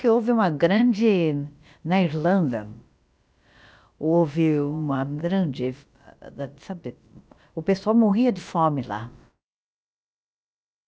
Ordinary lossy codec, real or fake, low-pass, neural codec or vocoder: none; fake; none; codec, 16 kHz, about 1 kbps, DyCAST, with the encoder's durations